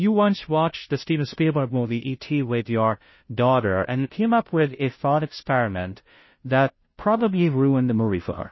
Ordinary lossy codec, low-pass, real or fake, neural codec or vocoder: MP3, 24 kbps; 7.2 kHz; fake; codec, 16 kHz, 0.5 kbps, FunCodec, trained on Chinese and English, 25 frames a second